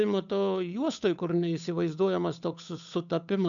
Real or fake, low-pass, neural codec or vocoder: fake; 7.2 kHz; codec, 16 kHz, 8 kbps, FunCodec, trained on Chinese and English, 25 frames a second